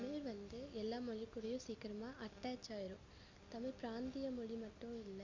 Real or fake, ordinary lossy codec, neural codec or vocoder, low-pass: real; MP3, 64 kbps; none; 7.2 kHz